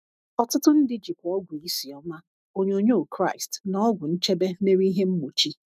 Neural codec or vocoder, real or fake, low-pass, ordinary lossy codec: autoencoder, 48 kHz, 128 numbers a frame, DAC-VAE, trained on Japanese speech; fake; 14.4 kHz; none